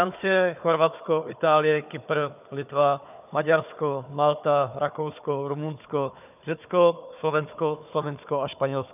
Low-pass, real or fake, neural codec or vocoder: 3.6 kHz; fake; codec, 16 kHz, 4 kbps, FunCodec, trained on Chinese and English, 50 frames a second